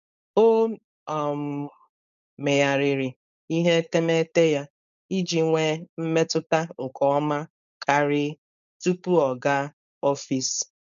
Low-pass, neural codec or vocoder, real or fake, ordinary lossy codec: 7.2 kHz; codec, 16 kHz, 4.8 kbps, FACodec; fake; none